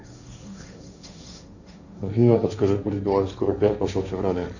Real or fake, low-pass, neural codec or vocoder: fake; 7.2 kHz; codec, 16 kHz, 1.1 kbps, Voila-Tokenizer